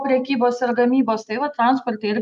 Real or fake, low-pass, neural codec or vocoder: real; 10.8 kHz; none